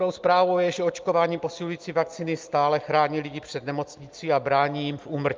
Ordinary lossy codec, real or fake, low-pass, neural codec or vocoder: Opus, 24 kbps; real; 7.2 kHz; none